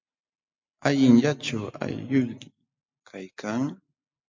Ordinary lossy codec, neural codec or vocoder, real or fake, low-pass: MP3, 48 kbps; none; real; 7.2 kHz